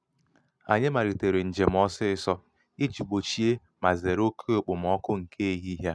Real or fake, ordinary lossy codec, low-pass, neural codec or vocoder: real; none; none; none